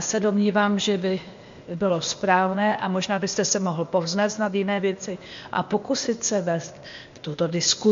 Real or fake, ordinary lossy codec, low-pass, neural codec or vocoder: fake; MP3, 48 kbps; 7.2 kHz; codec, 16 kHz, 0.8 kbps, ZipCodec